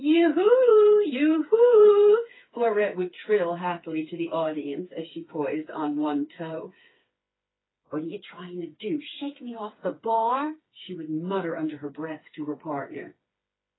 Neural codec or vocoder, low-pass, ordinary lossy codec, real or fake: codec, 16 kHz, 4 kbps, FreqCodec, smaller model; 7.2 kHz; AAC, 16 kbps; fake